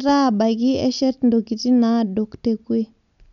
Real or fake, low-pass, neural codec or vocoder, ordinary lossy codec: real; 7.2 kHz; none; none